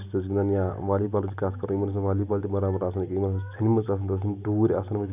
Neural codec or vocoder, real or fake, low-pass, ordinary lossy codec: none; real; 3.6 kHz; none